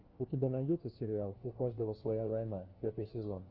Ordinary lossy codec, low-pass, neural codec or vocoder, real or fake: Opus, 16 kbps; 5.4 kHz; codec, 16 kHz, 1 kbps, FunCodec, trained on LibriTTS, 50 frames a second; fake